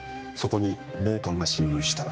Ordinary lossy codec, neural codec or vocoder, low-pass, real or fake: none; codec, 16 kHz, 2 kbps, X-Codec, HuBERT features, trained on general audio; none; fake